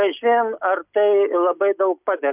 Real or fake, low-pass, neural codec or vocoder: real; 3.6 kHz; none